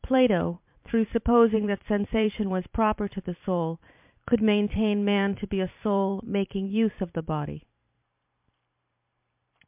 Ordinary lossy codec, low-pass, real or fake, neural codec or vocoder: MP3, 32 kbps; 3.6 kHz; fake; vocoder, 44.1 kHz, 128 mel bands every 512 samples, BigVGAN v2